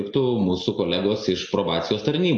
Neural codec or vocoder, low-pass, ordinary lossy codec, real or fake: none; 9.9 kHz; AAC, 64 kbps; real